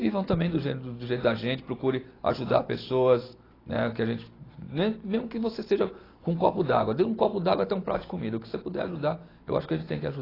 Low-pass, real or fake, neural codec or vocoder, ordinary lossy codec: 5.4 kHz; real; none; AAC, 24 kbps